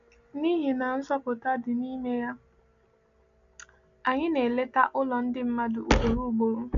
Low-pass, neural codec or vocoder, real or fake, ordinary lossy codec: 7.2 kHz; none; real; AAC, 64 kbps